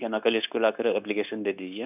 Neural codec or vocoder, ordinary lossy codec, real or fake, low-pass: codec, 16 kHz, 0.9 kbps, LongCat-Audio-Codec; none; fake; 3.6 kHz